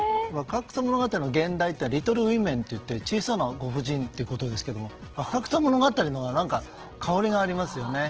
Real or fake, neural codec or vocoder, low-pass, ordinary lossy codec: real; none; 7.2 kHz; Opus, 16 kbps